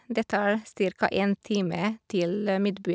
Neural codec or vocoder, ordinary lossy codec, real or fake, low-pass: none; none; real; none